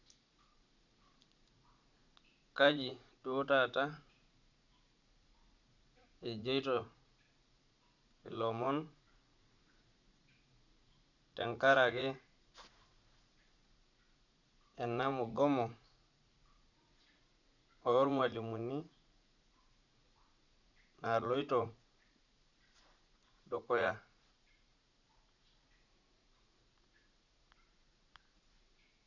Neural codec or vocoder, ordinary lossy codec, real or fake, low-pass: vocoder, 44.1 kHz, 80 mel bands, Vocos; none; fake; 7.2 kHz